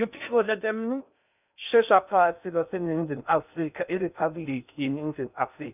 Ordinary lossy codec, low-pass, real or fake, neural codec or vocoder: none; 3.6 kHz; fake; codec, 16 kHz in and 24 kHz out, 0.6 kbps, FocalCodec, streaming, 2048 codes